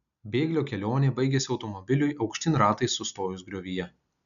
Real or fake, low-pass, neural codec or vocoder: real; 7.2 kHz; none